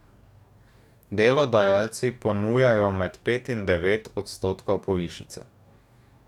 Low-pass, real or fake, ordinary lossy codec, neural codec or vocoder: 19.8 kHz; fake; none; codec, 44.1 kHz, 2.6 kbps, DAC